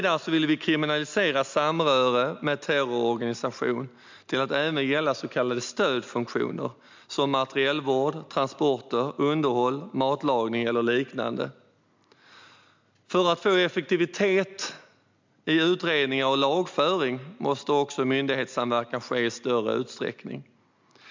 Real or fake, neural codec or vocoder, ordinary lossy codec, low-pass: real; none; MP3, 64 kbps; 7.2 kHz